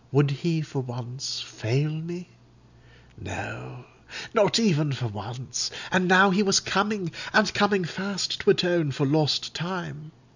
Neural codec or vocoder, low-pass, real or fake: none; 7.2 kHz; real